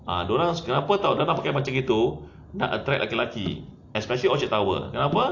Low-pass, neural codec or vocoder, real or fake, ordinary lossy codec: 7.2 kHz; none; real; AAC, 48 kbps